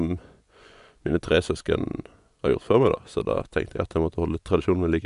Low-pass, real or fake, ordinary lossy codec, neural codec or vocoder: 10.8 kHz; fake; none; vocoder, 24 kHz, 100 mel bands, Vocos